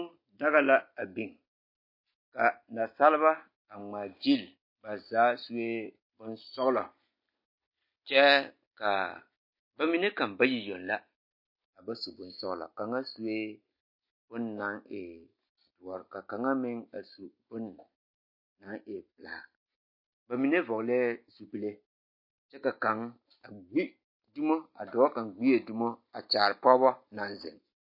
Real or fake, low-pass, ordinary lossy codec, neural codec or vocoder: real; 5.4 kHz; MP3, 32 kbps; none